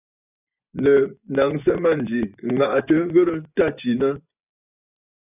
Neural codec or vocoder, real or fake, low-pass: vocoder, 24 kHz, 100 mel bands, Vocos; fake; 3.6 kHz